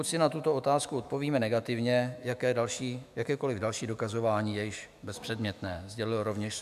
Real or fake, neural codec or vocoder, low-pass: fake; autoencoder, 48 kHz, 128 numbers a frame, DAC-VAE, trained on Japanese speech; 14.4 kHz